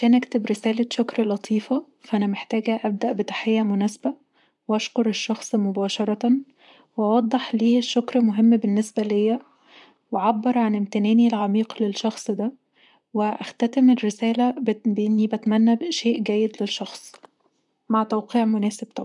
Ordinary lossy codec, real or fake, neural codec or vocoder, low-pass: MP3, 96 kbps; fake; vocoder, 44.1 kHz, 128 mel bands, Pupu-Vocoder; 10.8 kHz